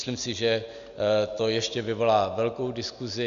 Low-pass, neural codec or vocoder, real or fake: 7.2 kHz; none; real